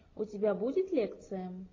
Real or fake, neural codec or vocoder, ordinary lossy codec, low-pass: real; none; AAC, 32 kbps; 7.2 kHz